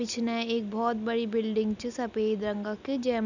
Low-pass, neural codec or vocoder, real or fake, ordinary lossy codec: 7.2 kHz; none; real; none